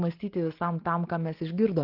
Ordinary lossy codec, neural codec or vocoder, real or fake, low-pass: Opus, 16 kbps; none; real; 5.4 kHz